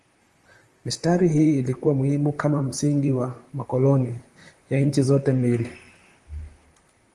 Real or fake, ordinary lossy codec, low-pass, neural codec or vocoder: fake; Opus, 24 kbps; 10.8 kHz; vocoder, 44.1 kHz, 128 mel bands, Pupu-Vocoder